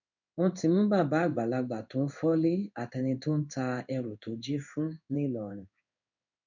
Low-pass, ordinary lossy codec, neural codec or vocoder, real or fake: 7.2 kHz; none; codec, 16 kHz in and 24 kHz out, 1 kbps, XY-Tokenizer; fake